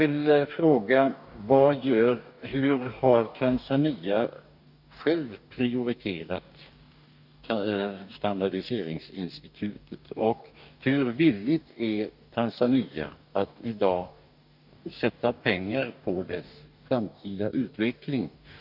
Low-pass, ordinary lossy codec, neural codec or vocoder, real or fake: 5.4 kHz; none; codec, 44.1 kHz, 2.6 kbps, DAC; fake